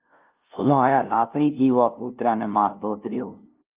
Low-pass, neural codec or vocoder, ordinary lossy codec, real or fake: 3.6 kHz; codec, 16 kHz, 0.5 kbps, FunCodec, trained on LibriTTS, 25 frames a second; Opus, 24 kbps; fake